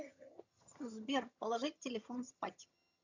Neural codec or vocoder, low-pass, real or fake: vocoder, 22.05 kHz, 80 mel bands, HiFi-GAN; 7.2 kHz; fake